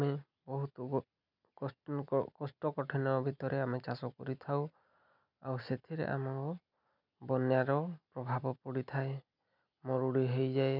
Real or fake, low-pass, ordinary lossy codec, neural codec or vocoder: real; 5.4 kHz; none; none